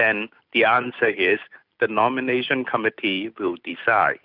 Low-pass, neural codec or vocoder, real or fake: 5.4 kHz; none; real